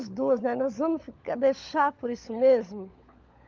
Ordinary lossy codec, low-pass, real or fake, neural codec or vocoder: Opus, 24 kbps; 7.2 kHz; fake; codec, 16 kHz, 16 kbps, FunCodec, trained on LibriTTS, 50 frames a second